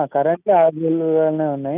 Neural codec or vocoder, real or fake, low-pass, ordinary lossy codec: none; real; 3.6 kHz; none